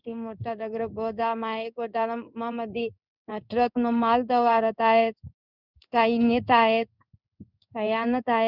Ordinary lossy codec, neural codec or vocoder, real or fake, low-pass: none; codec, 16 kHz in and 24 kHz out, 1 kbps, XY-Tokenizer; fake; 5.4 kHz